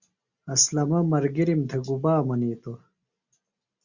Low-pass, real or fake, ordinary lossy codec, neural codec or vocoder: 7.2 kHz; real; Opus, 64 kbps; none